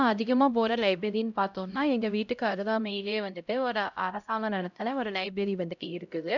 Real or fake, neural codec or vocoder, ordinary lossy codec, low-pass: fake; codec, 16 kHz, 0.5 kbps, X-Codec, HuBERT features, trained on LibriSpeech; none; 7.2 kHz